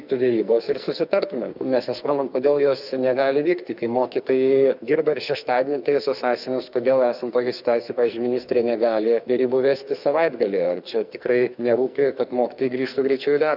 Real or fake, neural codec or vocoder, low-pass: fake; codec, 44.1 kHz, 2.6 kbps, SNAC; 5.4 kHz